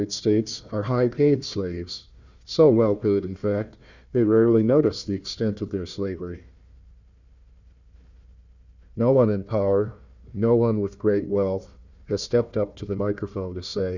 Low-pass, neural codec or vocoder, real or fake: 7.2 kHz; codec, 16 kHz, 1 kbps, FunCodec, trained on Chinese and English, 50 frames a second; fake